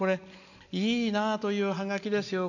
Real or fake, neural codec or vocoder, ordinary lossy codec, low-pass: real; none; none; 7.2 kHz